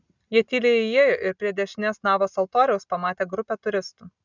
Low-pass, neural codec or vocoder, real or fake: 7.2 kHz; none; real